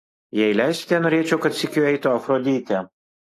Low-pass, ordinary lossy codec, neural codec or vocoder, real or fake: 14.4 kHz; AAC, 48 kbps; none; real